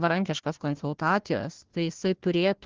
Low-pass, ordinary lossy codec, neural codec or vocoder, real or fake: 7.2 kHz; Opus, 16 kbps; codec, 16 kHz, 1 kbps, FunCodec, trained on Chinese and English, 50 frames a second; fake